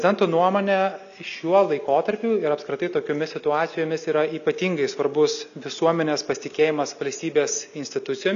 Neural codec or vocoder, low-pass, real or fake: none; 7.2 kHz; real